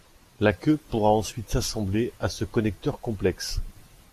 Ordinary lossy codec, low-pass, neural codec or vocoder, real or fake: AAC, 64 kbps; 14.4 kHz; none; real